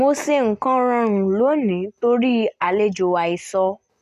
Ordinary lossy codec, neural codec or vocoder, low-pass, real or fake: none; none; 14.4 kHz; real